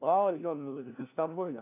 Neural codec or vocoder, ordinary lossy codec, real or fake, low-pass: codec, 16 kHz, 1 kbps, FunCodec, trained on LibriTTS, 50 frames a second; AAC, 32 kbps; fake; 3.6 kHz